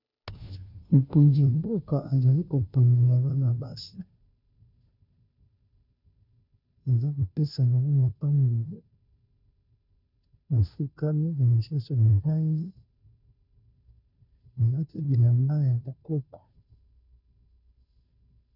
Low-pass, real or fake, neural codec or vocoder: 5.4 kHz; fake; codec, 16 kHz, 0.5 kbps, FunCodec, trained on Chinese and English, 25 frames a second